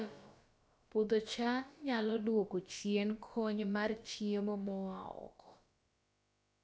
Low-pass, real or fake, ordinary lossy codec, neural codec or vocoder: none; fake; none; codec, 16 kHz, about 1 kbps, DyCAST, with the encoder's durations